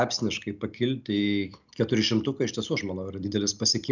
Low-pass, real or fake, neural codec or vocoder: 7.2 kHz; real; none